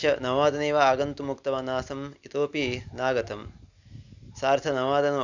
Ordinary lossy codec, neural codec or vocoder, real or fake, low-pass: none; none; real; 7.2 kHz